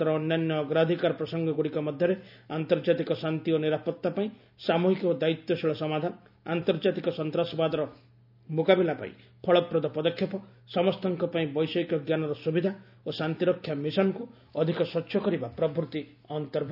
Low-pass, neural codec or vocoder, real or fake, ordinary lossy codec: 5.4 kHz; none; real; none